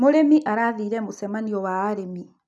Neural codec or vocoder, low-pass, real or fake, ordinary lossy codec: none; none; real; none